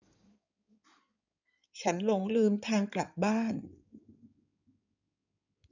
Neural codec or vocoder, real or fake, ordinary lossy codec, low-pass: codec, 16 kHz in and 24 kHz out, 2.2 kbps, FireRedTTS-2 codec; fake; none; 7.2 kHz